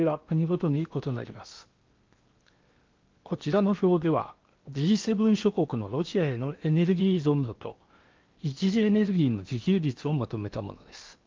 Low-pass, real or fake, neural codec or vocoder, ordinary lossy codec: 7.2 kHz; fake; codec, 16 kHz in and 24 kHz out, 0.8 kbps, FocalCodec, streaming, 65536 codes; Opus, 24 kbps